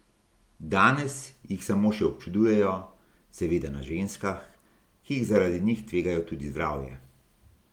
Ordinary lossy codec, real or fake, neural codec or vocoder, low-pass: Opus, 24 kbps; real; none; 19.8 kHz